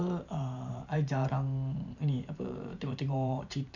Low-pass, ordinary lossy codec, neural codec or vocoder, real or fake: 7.2 kHz; none; vocoder, 44.1 kHz, 128 mel bands every 512 samples, BigVGAN v2; fake